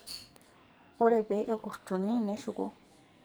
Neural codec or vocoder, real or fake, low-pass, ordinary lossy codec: codec, 44.1 kHz, 2.6 kbps, SNAC; fake; none; none